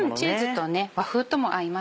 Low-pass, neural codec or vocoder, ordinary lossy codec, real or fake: none; none; none; real